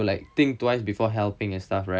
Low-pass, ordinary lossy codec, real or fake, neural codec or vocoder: none; none; real; none